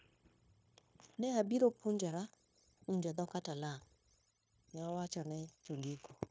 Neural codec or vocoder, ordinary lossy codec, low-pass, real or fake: codec, 16 kHz, 0.9 kbps, LongCat-Audio-Codec; none; none; fake